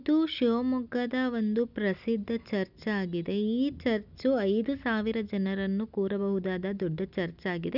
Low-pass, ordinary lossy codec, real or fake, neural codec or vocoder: 5.4 kHz; none; real; none